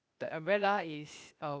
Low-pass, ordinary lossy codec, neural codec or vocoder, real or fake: none; none; codec, 16 kHz, 0.8 kbps, ZipCodec; fake